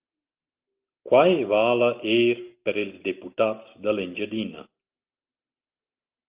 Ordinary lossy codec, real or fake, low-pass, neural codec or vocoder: Opus, 24 kbps; real; 3.6 kHz; none